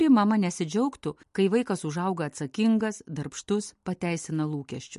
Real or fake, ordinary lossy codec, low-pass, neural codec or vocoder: real; MP3, 48 kbps; 14.4 kHz; none